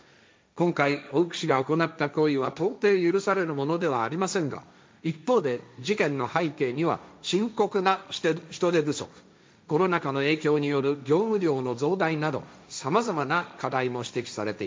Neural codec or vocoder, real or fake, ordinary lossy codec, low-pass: codec, 16 kHz, 1.1 kbps, Voila-Tokenizer; fake; none; none